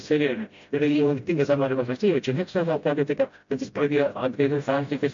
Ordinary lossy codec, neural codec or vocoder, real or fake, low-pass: MP3, 48 kbps; codec, 16 kHz, 0.5 kbps, FreqCodec, smaller model; fake; 7.2 kHz